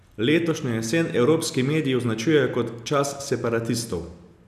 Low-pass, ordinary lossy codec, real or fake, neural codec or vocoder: 14.4 kHz; none; real; none